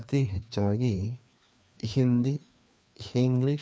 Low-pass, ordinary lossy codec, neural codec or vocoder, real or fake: none; none; codec, 16 kHz, 2 kbps, FreqCodec, larger model; fake